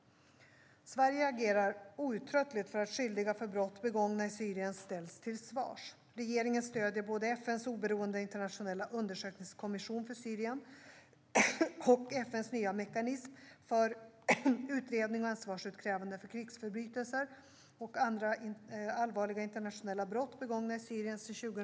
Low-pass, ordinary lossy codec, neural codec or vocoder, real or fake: none; none; none; real